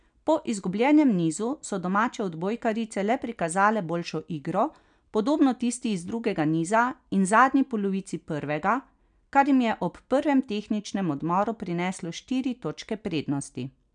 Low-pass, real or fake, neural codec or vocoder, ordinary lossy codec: 9.9 kHz; real; none; none